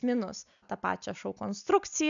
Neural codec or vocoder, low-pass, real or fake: none; 7.2 kHz; real